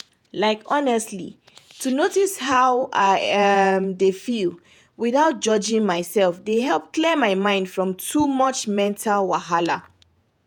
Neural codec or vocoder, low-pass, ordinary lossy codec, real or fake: vocoder, 48 kHz, 128 mel bands, Vocos; 19.8 kHz; none; fake